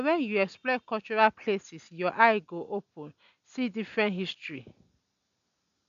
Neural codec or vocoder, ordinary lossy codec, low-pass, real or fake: none; none; 7.2 kHz; real